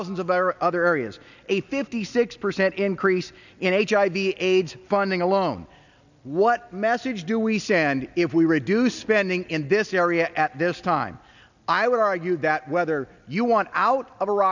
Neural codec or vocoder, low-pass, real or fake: none; 7.2 kHz; real